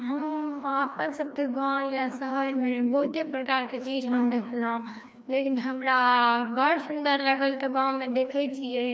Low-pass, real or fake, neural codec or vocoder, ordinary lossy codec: none; fake; codec, 16 kHz, 1 kbps, FreqCodec, larger model; none